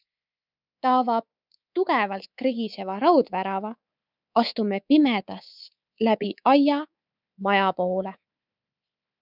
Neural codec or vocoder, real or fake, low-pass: codec, 24 kHz, 3.1 kbps, DualCodec; fake; 5.4 kHz